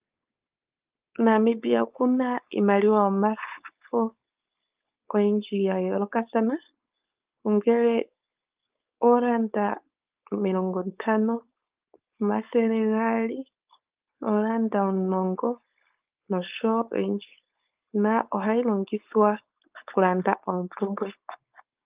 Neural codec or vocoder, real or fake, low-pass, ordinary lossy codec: codec, 16 kHz, 4.8 kbps, FACodec; fake; 3.6 kHz; Opus, 24 kbps